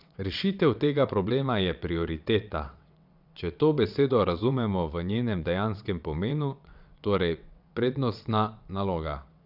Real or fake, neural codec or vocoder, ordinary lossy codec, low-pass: fake; vocoder, 22.05 kHz, 80 mel bands, WaveNeXt; none; 5.4 kHz